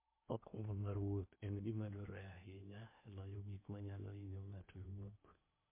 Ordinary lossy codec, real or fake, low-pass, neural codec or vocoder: MP3, 24 kbps; fake; 3.6 kHz; codec, 16 kHz in and 24 kHz out, 0.8 kbps, FocalCodec, streaming, 65536 codes